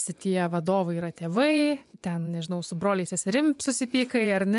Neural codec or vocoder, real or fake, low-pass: vocoder, 24 kHz, 100 mel bands, Vocos; fake; 10.8 kHz